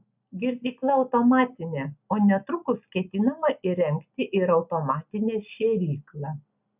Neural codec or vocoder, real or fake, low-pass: none; real; 3.6 kHz